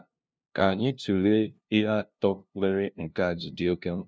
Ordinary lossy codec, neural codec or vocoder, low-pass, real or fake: none; codec, 16 kHz, 0.5 kbps, FunCodec, trained on LibriTTS, 25 frames a second; none; fake